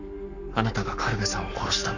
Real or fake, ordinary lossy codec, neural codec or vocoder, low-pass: fake; none; codec, 44.1 kHz, 7.8 kbps, Pupu-Codec; 7.2 kHz